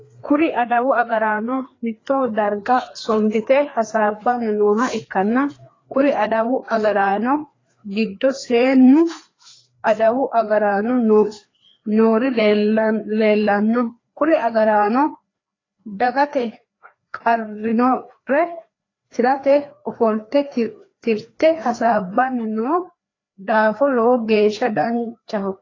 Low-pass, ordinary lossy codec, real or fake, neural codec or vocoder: 7.2 kHz; AAC, 32 kbps; fake; codec, 16 kHz, 2 kbps, FreqCodec, larger model